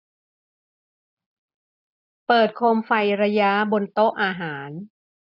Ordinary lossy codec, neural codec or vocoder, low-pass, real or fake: none; none; 5.4 kHz; real